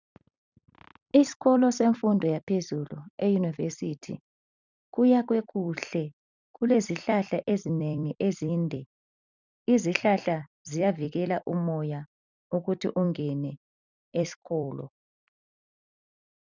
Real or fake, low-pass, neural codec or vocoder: fake; 7.2 kHz; vocoder, 44.1 kHz, 128 mel bands every 256 samples, BigVGAN v2